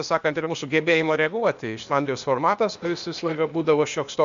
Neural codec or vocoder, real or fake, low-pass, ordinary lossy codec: codec, 16 kHz, 0.8 kbps, ZipCodec; fake; 7.2 kHz; MP3, 64 kbps